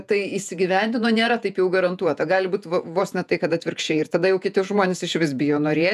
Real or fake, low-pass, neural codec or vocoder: fake; 14.4 kHz; vocoder, 48 kHz, 128 mel bands, Vocos